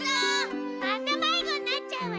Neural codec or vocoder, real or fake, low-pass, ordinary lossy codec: none; real; none; none